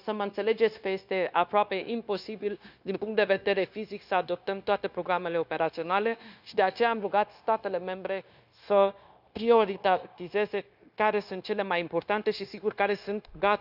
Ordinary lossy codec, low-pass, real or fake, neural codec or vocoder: none; 5.4 kHz; fake; codec, 16 kHz, 0.9 kbps, LongCat-Audio-Codec